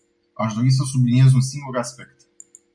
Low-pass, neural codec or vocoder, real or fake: 9.9 kHz; none; real